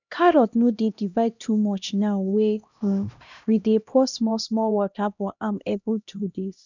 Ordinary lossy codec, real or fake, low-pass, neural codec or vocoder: none; fake; 7.2 kHz; codec, 16 kHz, 1 kbps, X-Codec, HuBERT features, trained on LibriSpeech